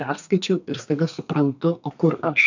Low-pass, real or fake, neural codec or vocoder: 7.2 kHz; fake; codec, 32 kHz, 1.9 kbps, SNAC